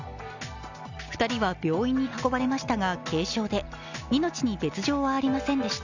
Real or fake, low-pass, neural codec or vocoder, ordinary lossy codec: real; 7.2 kHz; none; none